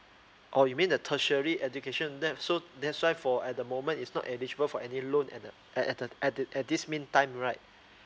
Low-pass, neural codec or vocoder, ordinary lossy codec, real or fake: none; none; none; real